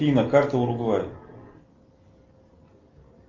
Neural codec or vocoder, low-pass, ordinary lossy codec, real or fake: none; 7.2 kHz; Opus, 32 kbps; real